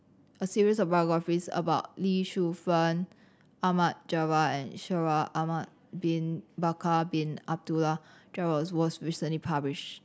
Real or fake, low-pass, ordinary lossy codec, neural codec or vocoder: real; none; none; none